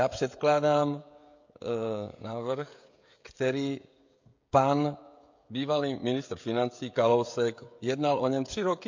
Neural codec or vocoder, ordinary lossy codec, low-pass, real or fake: codec, 16 kHz, 16 kbps, FreqCodec, smaller model; MP3, 48 kbps; 7.2 kHz; fake